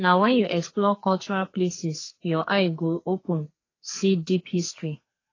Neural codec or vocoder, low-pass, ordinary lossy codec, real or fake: codec, 44.1 kHz, 2.6 kbps, SNAC; 7.2 kHz; AAC, 32 kbps; fake